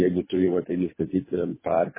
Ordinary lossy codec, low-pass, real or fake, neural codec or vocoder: MP3, 16 kbps; 3.6 kHz; fake; codec, 24 kHz, 3 kbps, HILCodec